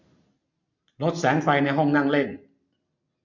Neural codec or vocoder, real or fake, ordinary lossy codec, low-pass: none; real; none; 7.2 kHz